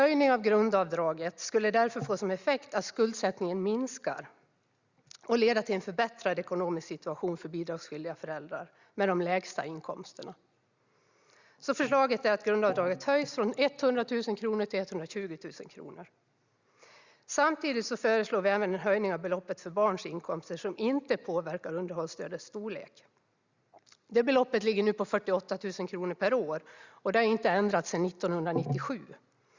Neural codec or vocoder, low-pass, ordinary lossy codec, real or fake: none; 7.2 kHz; Opus, 64 kbps; real